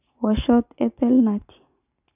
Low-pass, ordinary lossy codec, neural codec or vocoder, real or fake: 3.6 kHz; none; none; real